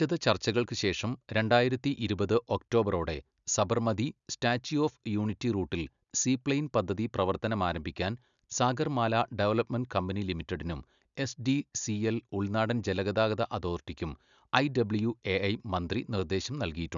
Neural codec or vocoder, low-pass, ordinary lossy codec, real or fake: none; 7.2 kHz; none; real